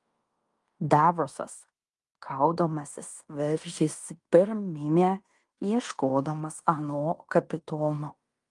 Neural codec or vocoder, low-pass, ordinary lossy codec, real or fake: codec, 16 kHz in and 24 kHz out, 0.9 kbps, LongCat-Audio-Codec, fine tuned four codebook decoder; 10.8 kHz; Opus, 32 kbps; fake